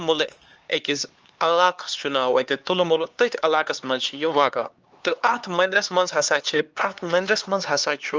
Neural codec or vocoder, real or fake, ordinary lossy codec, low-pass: codec, 16 kHz, 2 kbps, X-Codec, HuBERT features, trained on LibriSpeech; fake; Opus, 24 kbps; 7.2 kHz